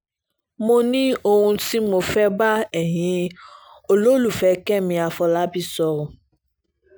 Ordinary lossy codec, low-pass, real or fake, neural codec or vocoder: none; none; real; none